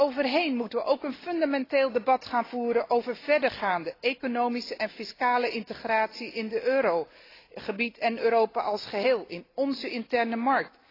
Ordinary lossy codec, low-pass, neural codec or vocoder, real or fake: AAC, 24 kbps; 5.4 kHz; none; real